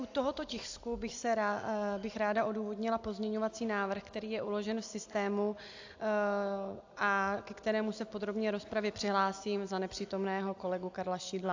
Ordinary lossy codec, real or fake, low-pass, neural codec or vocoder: AAC, 48 kbps; real; 7.2 kHz; none